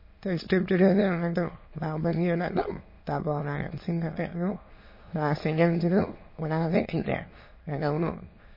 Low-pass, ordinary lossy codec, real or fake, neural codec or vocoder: 5.4 kHz; MP3, 24 kbps; fake; autoencoder, 22.05 kHz, a latent of 192 numbers a frame, VITS, trained on many speakers